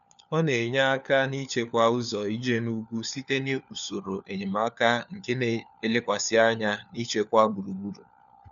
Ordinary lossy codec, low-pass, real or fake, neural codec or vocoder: none; 7.2 kHz; fake; codec, 16 kHz, 4 kbps, FunCodec, trained on LibriTTS, 50 frames a second